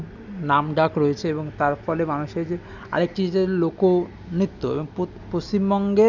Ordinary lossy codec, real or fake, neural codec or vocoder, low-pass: none; real; none; 7.2 kHz